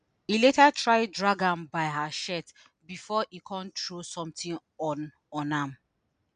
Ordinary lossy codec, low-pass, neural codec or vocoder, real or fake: none; 9.9 kHz; none; real